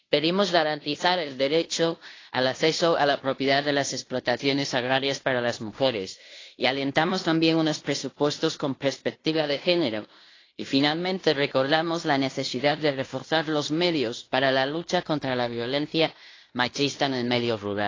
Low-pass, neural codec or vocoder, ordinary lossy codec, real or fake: 7.2 kHz; codec, 16 kHz in and 24 kHz out, 0.9 kbps, LongCat-Audio-Codec, fine tuned four codebook decoder; AAC, 32 kbps; fake